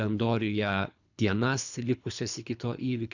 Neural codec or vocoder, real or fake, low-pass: codec, 24 kHz, 3 kbps, HILCodec; fake; 7.2 kHz